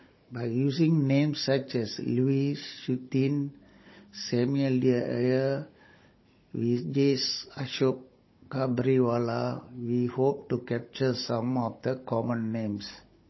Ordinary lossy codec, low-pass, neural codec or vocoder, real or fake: MP3, 24 kbps; 7.2 kHz; codec, 16 kHz, 16 kbps, FunCodec, trained on Chinese and English, 50 frames a second; fake